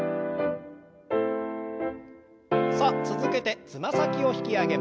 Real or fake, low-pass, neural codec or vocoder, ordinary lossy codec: real; none; none; none